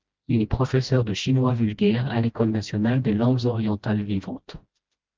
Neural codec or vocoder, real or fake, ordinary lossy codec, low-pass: codec, 16 kHz, 1 kbps, FreqCodec, smaller model; fake; Opus, 32 kbps; 7.2 kHz